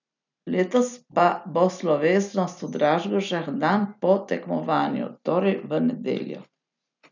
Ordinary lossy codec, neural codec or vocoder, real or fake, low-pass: none; none; real; 7.2 kHz